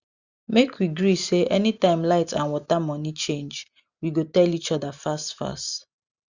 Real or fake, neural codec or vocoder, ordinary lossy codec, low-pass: real; none; Opus, 64 kbps; 7.2 kHz